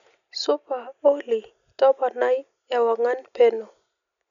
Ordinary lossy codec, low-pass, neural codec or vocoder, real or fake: none; 7.2 kHz; none; real